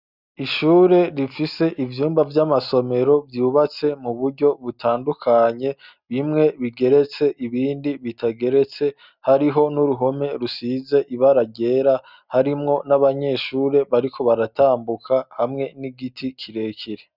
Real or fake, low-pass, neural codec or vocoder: real; 5.4 kHz; none